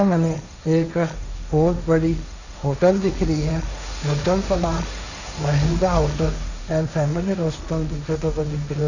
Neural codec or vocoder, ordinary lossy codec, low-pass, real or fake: codec, 16 kHz, 1.1 kbps, Voila-Tokenizer; none; 7.2 kHz; fake